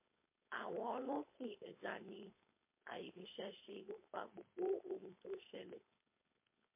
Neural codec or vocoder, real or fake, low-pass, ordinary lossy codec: codec, 16 kHz, 4.8 kbps, FACodec; fake; 3.6 kHz; MP3, 24 kbps